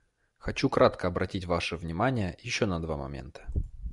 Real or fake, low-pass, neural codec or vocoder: real; 10.8 kHz; none